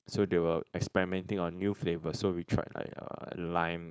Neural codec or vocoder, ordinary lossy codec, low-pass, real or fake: codec, 16 kHz, 4.8 kbps, FACodec; none; none; fake